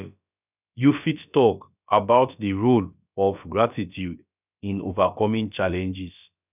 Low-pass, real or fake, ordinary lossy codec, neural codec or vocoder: 3.6 kHz; fake; none; codec, 16 kHz, about 1 kbps, DyCAST, with the encoder's durations